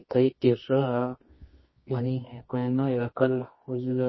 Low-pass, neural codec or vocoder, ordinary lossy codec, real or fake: 7.2 kHz; codec, 24 kHz, 0.9 kbps, WavTokenizer, medium music audio release; MP3, 24 kbps; fake